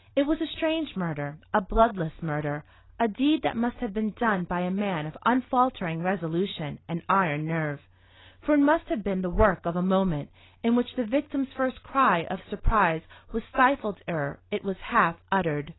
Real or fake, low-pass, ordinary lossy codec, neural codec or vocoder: real; 7.2 kHz; AAC, 16 kbps; none